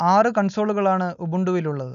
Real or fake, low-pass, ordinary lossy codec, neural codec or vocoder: real; 7.2 kHz; none; none